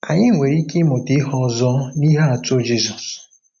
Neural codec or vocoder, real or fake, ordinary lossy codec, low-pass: none; real; none; 7.2 kHz